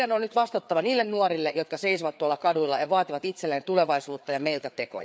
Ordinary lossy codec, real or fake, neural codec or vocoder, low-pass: none; fake; codec, 16 kHz, 4 kbps, FreqCodec, larger model; none